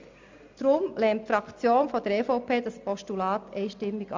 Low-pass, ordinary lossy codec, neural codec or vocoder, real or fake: 7.2 kHz; MP3, 64 kbps; none; real